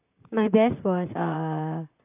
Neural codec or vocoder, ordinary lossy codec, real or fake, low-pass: vocoder, 44.1 kHz, 128 mel bands, Pupu-Vocoder; AAC, 32 kbps; fake; 3.6 kHz